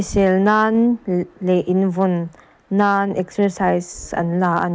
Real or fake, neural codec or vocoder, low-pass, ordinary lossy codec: real; none; none; none